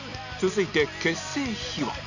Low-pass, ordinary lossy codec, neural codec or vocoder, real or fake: 7.2 kHz; none; none; real